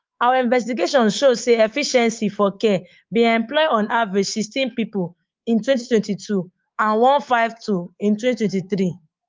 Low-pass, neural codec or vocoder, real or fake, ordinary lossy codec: 7.2 kHz; codec, 24 kHz, 3.1 kbps, DualCodec; fake; Opus, 32 kbps